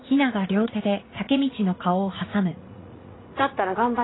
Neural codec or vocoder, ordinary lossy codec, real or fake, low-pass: vocoder, 44.1 kHz, 80 mel bands, Vocos; AAC, 16 kbps; fake; 7.2 kHz